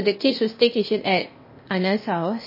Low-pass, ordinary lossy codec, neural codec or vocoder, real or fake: 5.4 kHz; MP3, 24 kbps; codec, 16 kHz, 0.8 kbps, ZipCodec; fake